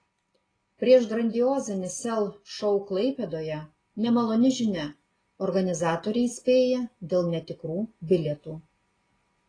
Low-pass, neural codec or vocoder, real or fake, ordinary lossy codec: 9.9 kHz; none; real; AAC, 32 kbps